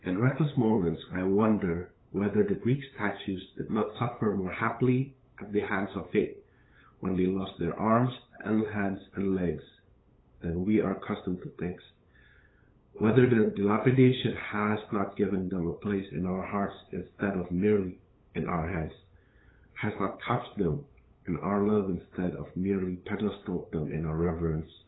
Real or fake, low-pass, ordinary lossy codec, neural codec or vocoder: fake; 7.2 kHz; AAC, 16 kbps; codec, 16 kHz, 8 kbps, FunCodec, trained on LibriTTS, 25 frames a second